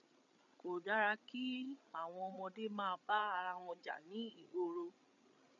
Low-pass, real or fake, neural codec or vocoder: 7.2 kHz; fake; codec, 16 kHz, 8 kbps, FreqCodec, larger model